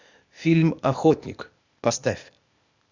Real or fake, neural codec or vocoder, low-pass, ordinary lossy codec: fake; codec, 16 kHz, 0.8 kbps, ZipCodec; 7.2 kHz; Opus, 64 kbps